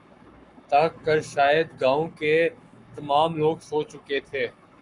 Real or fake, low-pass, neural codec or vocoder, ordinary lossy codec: fake; 10.8 kHz; codec, 44.1 kHz, 7.8 kbps, Pupu-Codec; MP3, 96 kbps